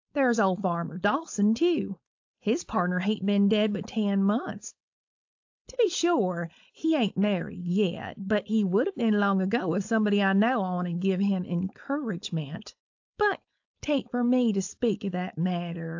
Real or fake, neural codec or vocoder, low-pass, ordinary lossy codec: fake; codec, 16 kHz, 4.8 kbps, FACodec; 7.2 kHz; AAC, 48 kbps